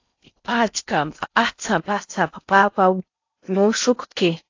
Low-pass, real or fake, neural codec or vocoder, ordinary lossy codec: 7.2 kHz; fake; codec, 16 kHz in and 24 kHz out, 0.6 kbps, FocalCodec, streaming, 4096 codes; AAC, 48 kbps